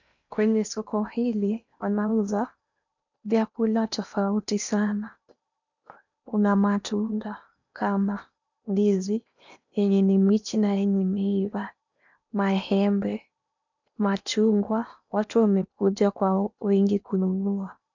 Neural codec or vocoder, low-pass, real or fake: codec, 16 kHz in and 24 kHz out, 0.8 kbps, FocalCodec, streaming, 65536 codes; 7.2 kHz; fake